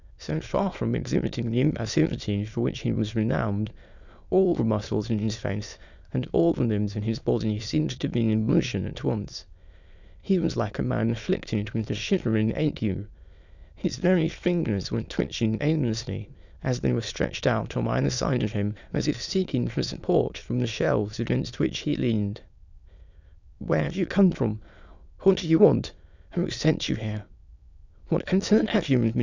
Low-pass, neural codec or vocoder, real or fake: 7.2 kHz; autoencoder, 22.05 kHz, a latent of 192 numbers a frame, VITS, trained on many speakers; fake